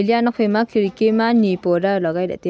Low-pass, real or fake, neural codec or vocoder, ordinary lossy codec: none; real; none; none